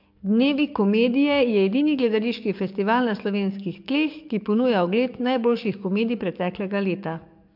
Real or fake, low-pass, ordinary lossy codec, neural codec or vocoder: fake; 5.4 kHz; MP3, 48 kbps; codec, 44.1 kHz, 7.8 kbps, DAC